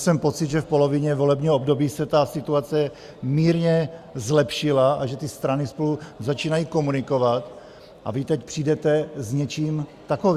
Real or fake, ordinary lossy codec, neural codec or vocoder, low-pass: real; Opus, 64 kbps; none; 14.4 kHz